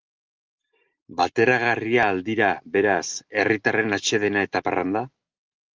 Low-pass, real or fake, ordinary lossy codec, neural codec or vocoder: 7.2 kHz; real; Opus, 24 kbps; none